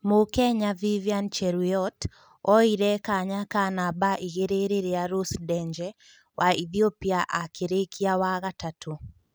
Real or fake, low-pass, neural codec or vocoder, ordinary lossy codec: real; none; none; none